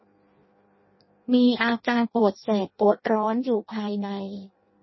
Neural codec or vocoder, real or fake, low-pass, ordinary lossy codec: codec, 16 kHz in and 24 kHz out, 0.6 kbps, FireRedTTS-2 codec; fake; 7.2 kHz; MP3, 24 kbps